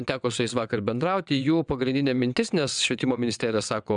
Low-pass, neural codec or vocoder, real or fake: 9.9 kHz; vocoder, 22.05 kHz, 80 mel bands, WaveNeXt; fake